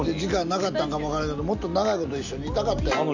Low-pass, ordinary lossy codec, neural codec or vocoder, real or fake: 7.2 kHz; none; none; real